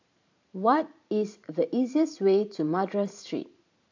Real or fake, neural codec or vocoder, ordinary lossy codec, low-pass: fake; vocoder, 44.1 kHz, 128 mel bands every 512 samples, BigVGAN v2; AAC, 48 kbps; 7.2 kHz